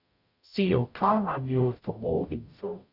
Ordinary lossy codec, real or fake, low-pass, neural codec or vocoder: none; fake; 5.4 kHz; codec, 44.1 kHz, 0.9 kbps, DAC